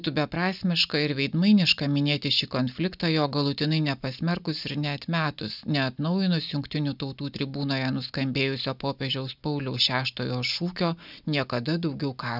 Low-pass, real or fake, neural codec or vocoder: 5.4 kHz; real; none